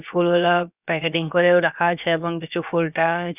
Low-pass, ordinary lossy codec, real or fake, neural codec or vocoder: 3.6 kHz; none; fake; codec, 16 kHz, about 1 kbps, DyCAST, with the encoder's durations